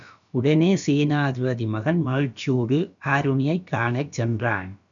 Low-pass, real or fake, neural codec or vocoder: 7.2 kHz; fake; codec, 16 kHz, about 1 kbps, DyCAST, with the encoder's durations